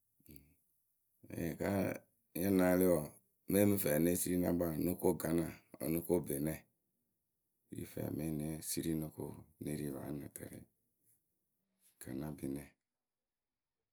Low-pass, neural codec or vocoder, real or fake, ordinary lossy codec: none; none; real; none